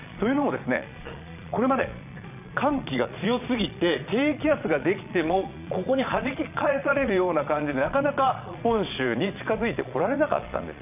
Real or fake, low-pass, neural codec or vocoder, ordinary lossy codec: fake; 3.6 kHz; vocoder, 22.05 kHz, 80 mel bands, Vocos; none